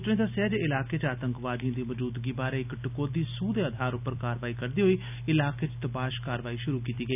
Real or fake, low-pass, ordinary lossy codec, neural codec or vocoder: real; 3.6 kHz; none; none